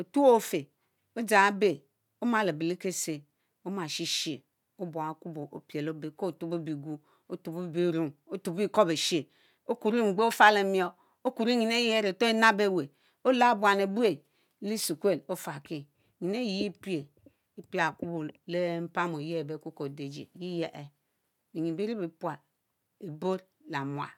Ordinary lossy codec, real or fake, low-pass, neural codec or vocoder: none; real; 19.8 kHz; none